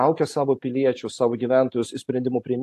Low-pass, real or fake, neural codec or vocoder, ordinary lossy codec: 14.4 kHz; real; none; AAC, 64 kbps